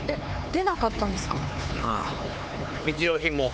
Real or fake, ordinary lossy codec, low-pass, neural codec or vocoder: fake; none; none; codec, 16 kHz, 4 kbps, X-Codec, HuBERT features, trained on LibriSpeech